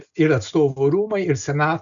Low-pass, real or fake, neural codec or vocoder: 7.2 kHz; real; none